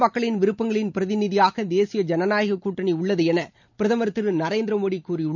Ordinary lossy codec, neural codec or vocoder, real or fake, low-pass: none; none; real; 7.2 kHz